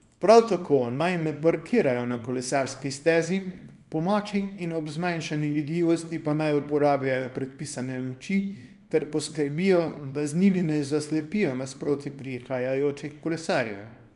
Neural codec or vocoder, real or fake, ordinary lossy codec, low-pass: codec, 24 kHz, 0.9 kbps, WavTokenizer, small release; fake; none; 10.8 kHz